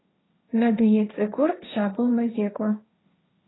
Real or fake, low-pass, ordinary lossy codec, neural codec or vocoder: fake; 7.2 kHz; AAC, 16 kbps; codec, 16 kHz, 1.1 kbps, Voila-Tokenizer